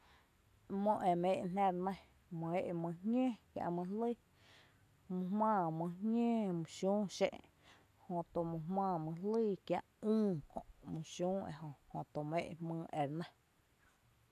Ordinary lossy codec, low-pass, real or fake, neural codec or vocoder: none; 14.4 kHz; real; none